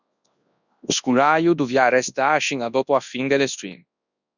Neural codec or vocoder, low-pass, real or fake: codec, 24 kHz, 0.9 kbps, WavTokenizer, large speech release; 7.2 kHz; fake